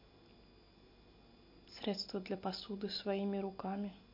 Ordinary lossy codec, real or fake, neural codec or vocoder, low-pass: MP3, 32 kbps; real; none; 5.4 kHz